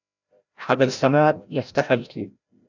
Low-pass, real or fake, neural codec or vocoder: 7.2 kHz; fake; codec, 16 kHz, 0.5 kbps, FreqCodec, larger model